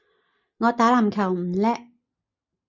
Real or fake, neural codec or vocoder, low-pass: real; none; 7.2 kHz